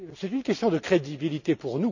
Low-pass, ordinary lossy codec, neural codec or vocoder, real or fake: 7.2 kHz; none; none; real